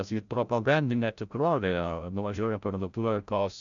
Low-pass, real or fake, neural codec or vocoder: 7.2 kHz; fake; codec, 16 kHz, 0.5 kbps, FreqCodec, larger model